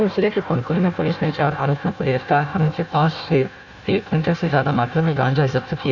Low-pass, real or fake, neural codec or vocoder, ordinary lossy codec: 7.2 kHz; fake; codec, 16 kHz, 1 kbps, FunCodec, trained on Chinese and English, 50 frames a second; none